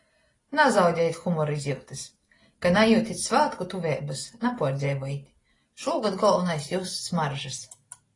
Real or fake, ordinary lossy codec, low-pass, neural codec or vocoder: real; AAC, 32 kbps; 10.8 kHz; none